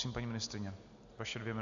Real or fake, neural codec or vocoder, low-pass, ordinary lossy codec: real; none; 7.2 kHz; AAC, 64 kbps